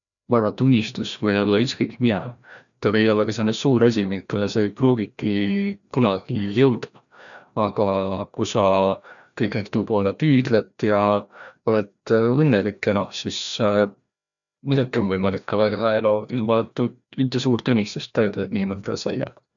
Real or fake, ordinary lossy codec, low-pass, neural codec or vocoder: fake; none; 7.2 kHz; codec, 16 kHz, 1 kbps, FreqCodec, larger model